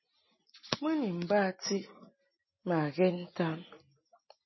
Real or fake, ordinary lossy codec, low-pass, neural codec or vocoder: real; MP3, 24 kbps; 7.2 kHz; none